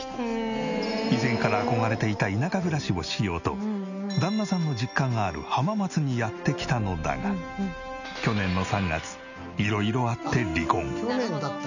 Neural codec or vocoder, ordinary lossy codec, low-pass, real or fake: none; none; 7.2 kHz; real